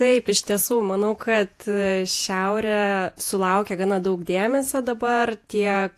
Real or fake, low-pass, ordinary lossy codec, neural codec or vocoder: fake; 14.4 kHz; AAC, 64 kbps; vocoder, 48 kHz, 128 mel bands, Vocos